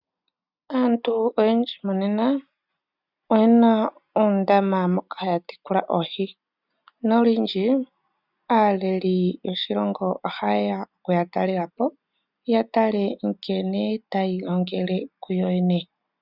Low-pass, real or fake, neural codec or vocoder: 5.4 kHz; real; none